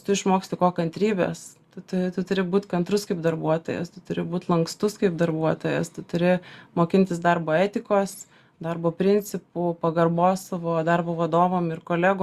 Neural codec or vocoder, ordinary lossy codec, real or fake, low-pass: none; Opus, 64 kbps; real; 14.4 kHz